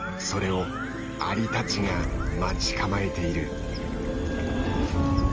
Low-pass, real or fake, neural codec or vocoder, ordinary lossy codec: 7.2 kHz; real; none; Opus, 24 kbps